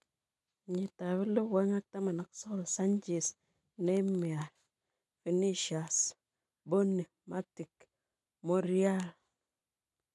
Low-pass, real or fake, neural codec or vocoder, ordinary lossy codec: none; real; none; none